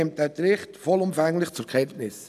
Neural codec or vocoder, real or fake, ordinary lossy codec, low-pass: none; real; none; 14.4 kHz